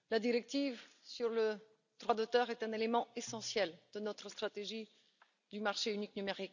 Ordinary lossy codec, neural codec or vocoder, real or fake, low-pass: none; none; real; 7.2 kHz